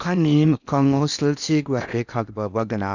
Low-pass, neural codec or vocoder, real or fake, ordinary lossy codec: 7.2 kHz; codec, 16 kHz in and 24 kHz out, 0.8 kbps, FocalCodec, streaming, 65536 codes; fake; none